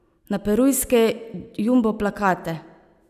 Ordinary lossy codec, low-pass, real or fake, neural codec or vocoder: none; 14.4 kHz; real; none